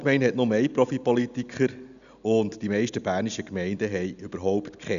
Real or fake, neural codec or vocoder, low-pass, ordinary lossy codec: real; none; 7.2 kHz; none